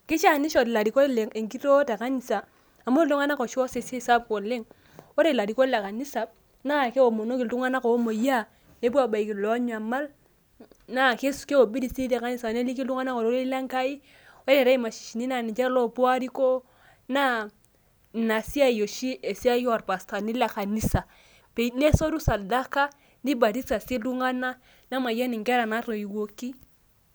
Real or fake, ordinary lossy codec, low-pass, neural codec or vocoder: real; none; none; none